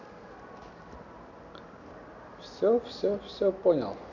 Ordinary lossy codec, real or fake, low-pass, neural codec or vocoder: none; real; 7.2 kHz; none